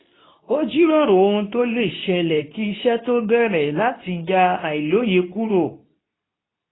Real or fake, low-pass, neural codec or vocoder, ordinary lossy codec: fake; 7.2 kHz; codec, 24 kHz, 0.9 kbps, WavTokenizer, medium speech release version 2; AAC, 16 kbps